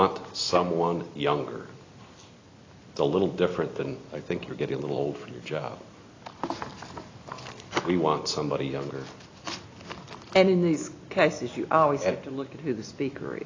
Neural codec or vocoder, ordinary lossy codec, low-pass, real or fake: none; AAC, 32 kbps; 7.2 kHz; real